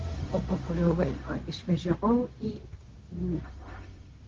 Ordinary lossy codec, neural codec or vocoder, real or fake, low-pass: Opus, 16 kbps; codec, 16 kHz, 0.4 kbps, LongCat-Audio-Codec; fake; 7.2 kHz